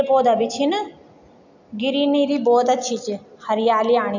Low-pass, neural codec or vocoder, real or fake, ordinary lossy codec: 7.2 kHz; none; real; none